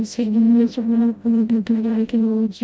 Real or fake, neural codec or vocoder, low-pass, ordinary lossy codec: fake; codec, 16 kHz, 0.5 kbps, FreqCodec, smaller model; none; none